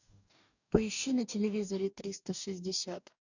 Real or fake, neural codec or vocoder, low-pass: fake; codec, 44.1 kHz, 2.6 kbps, DAC; 7.2 kHz